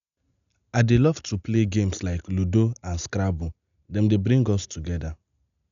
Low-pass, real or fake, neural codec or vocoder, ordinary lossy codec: 7.2 kHz; real; none; none